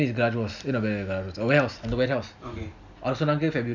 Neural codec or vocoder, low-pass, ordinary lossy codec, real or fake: none; 7.2 kHz; none; real